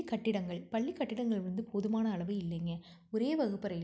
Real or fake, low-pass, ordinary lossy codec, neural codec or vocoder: real; none; none; none